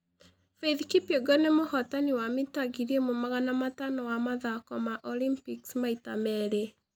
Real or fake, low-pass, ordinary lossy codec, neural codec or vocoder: real; none; none; none